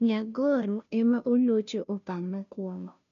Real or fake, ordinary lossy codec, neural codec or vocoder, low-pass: fake; none; codec, 16 kHz, 0.5 kbps, FunCodec, trained on Chinese and English, 25 frames a second; 7.2 kHz